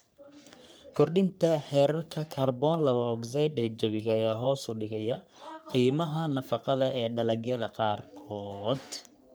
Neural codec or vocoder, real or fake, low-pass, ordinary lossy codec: codec, 44.1 kHz, 3.4 kbps, Pupu-Codec; fake; none; none